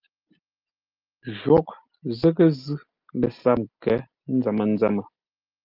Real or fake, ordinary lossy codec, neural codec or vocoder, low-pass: real; Opus, 24 kbps; none; 5.4 kHz